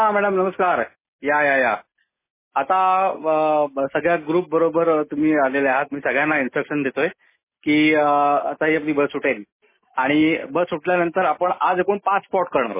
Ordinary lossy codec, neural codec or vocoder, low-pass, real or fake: MP3, 16 kbps; none; 3.6 kHz; real